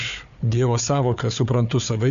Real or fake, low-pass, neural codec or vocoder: fake; 7.2 kHz; codec, 16 kHz, 4 kbps, FunCodec, trained on Chinese and English, 50 frames a second